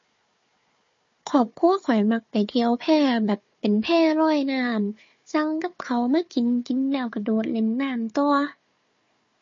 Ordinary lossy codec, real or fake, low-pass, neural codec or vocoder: MP3, 32 kbps; fake; 7.2 kHz; codec, 16 kHz, 4 kbps, FunCodec, trained on Chinese and English, 50 frames a second